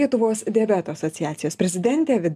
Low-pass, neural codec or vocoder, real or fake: 14.4 kHz; none; real